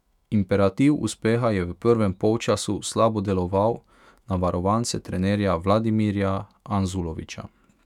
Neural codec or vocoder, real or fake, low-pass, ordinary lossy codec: autoencoder, 48 kHz, 128 numbers a frame, DAC-VAE, trained on Japanese speech; fake; 19.8 kHz; none